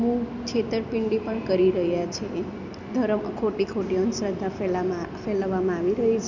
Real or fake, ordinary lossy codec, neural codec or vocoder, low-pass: real; none; none; 7.2 kHz